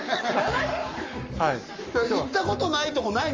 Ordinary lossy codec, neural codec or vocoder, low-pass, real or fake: Opus, 32 kbps; codec, 44.1 kHz, 7.8 kbps, Pupu-Codec; 7.2 kHz; fake